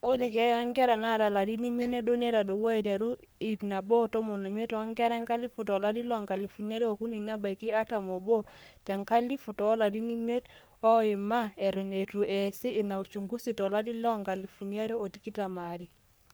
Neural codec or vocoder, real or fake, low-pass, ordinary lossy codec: codec, 44.1 kHz, 3.4 kbps, Pupu-Codec; fake; none; none